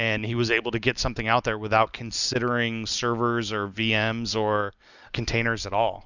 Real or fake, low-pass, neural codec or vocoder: real; 7.2 kHz; none